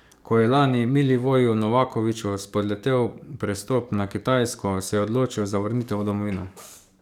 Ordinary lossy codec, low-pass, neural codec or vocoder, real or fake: none; 19.8 kHz; codec, 44.1 kHz, 7.8 kbps, DAC; fake